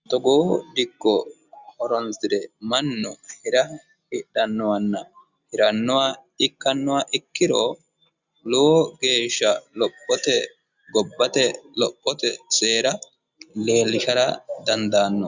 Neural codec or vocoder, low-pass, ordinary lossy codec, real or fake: none; 7.2 kHz; Opus, 64 kbps; real